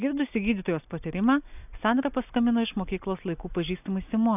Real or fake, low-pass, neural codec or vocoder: real; 3.6 kHz; none